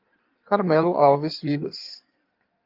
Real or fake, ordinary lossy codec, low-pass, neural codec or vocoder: fake; Opus, 32 kbps; 5.4 kHz; codec, 16 kHz in and 24 kHz out, 1.1 kbps, FireRedTTS-2 codec